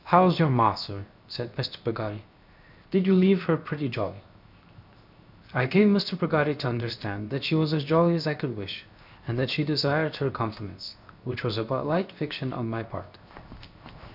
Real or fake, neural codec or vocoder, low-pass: fake; codec, 16 kHz, 0.7 kbps, FocalCodec; 5.4 kHz